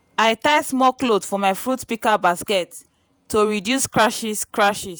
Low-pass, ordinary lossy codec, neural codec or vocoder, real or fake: none; none; vocoder, 48 kHz, 128 mel bands, Vocos; fake